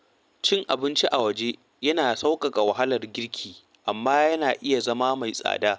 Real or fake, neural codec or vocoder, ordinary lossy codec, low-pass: real; none; none; none